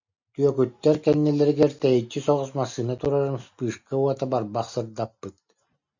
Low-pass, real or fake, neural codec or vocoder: 7.2 kHz; real; none